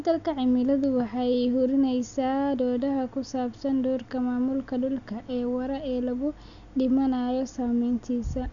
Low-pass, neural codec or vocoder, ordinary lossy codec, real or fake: 7.2 kHz; none; none; real